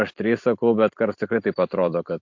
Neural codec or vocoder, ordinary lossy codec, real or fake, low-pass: vocoder, 44.1 kHz, 128 mel bands every 256 samples, BigVGAN v2; MP3, 48 kbps; fake; 7.2 kHz